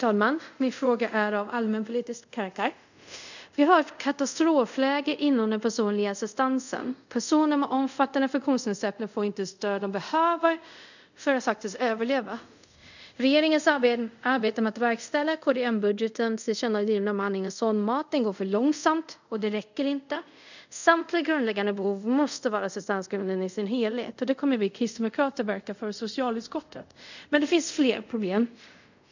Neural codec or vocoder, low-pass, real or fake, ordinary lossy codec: codec, 24 kHz, 0.5 kbps, DualCodec; 7.2 kHz; fake; none